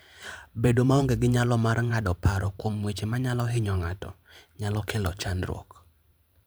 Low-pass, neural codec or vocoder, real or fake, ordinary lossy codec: none; vocoder, 44.1 kHz, 128 mel bands every 512 samples, BigVGAN v2; fake; none